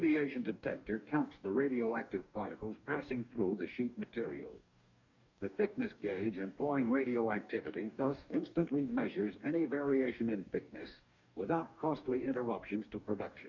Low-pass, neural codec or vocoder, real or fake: 7.2 kHz; codec, 44.1 kHz, 2.6 kbps, DAC; fake